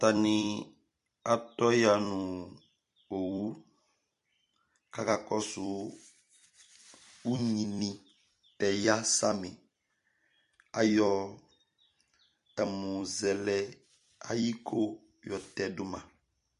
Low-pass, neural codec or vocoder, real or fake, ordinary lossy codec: 14.4 kHz; vocoder, 44.1 kHz, 128 mel bands every 256 samples, BigVGAN v2; fake; MP3, 48 kbps